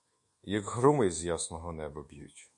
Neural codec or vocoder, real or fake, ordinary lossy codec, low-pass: codec, 24 kHz, 1.2 kbps, DualCodec; fake; MP3, 48 kbps; 10.8 kHz